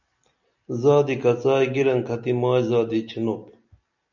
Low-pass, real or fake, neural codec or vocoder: 7.2 kHz; real; none